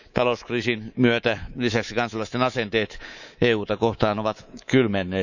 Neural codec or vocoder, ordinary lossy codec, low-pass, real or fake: codec, 24 kHz, 3.1 kbps, DualCodec; none; 7.2 kHz; fake